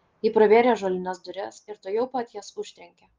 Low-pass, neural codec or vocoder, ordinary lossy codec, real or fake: 7.2 kHz; none; Opus, 32 kbps; real